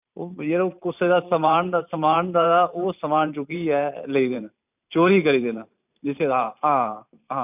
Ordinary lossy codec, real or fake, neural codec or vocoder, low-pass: none; fake; vocoder, 44.1 kHz, 128 mel bands every 512 samples, BigVGAN v2; 3.6 kHz